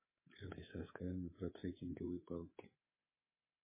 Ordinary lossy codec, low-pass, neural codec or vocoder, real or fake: MP3, 16 kbps; 3.6 kHz; codec, 24 kHz, 3.1 kbps, DualCodec; fake